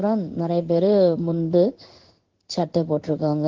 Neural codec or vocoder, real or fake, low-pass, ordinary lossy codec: codec, 16 kHz in and 24 kHz out, 1 kbps, XY-Tokenizer; fake; 7.2 kHz; Opus, 16 kbps